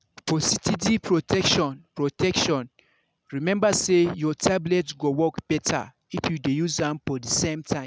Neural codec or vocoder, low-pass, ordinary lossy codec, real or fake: none; none; none; real